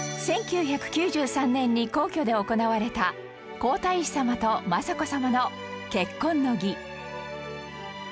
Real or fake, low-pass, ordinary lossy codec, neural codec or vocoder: real; none; none; none